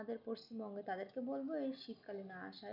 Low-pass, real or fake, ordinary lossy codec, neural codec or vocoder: 5.4 kHz; real; none; none